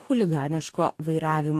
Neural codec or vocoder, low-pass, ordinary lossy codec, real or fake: codec, 44.1 kHz, 2.6 kbps, DAC; 14.4 kHz; AAC, 64 kbps; fake